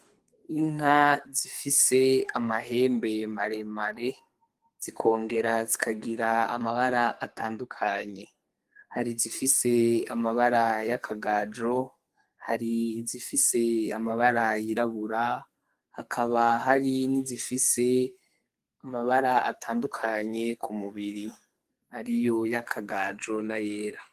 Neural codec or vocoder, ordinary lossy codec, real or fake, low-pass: codec, 44.1 kHz, 2.6 kbps, SNAC; Opus, 24 kbps; fake; 14.4 kHz